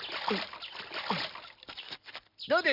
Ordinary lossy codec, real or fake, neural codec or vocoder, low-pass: none; real; none; 5.4 kHz